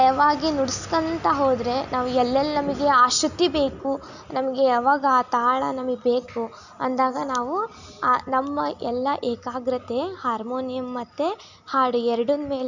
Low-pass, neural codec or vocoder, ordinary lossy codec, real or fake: 7.2 kHz; none; none; real